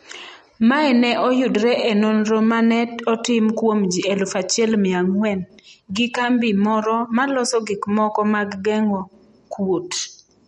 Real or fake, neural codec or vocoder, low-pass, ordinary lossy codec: real; none; 19.8 kHz; MP3, 48 kbps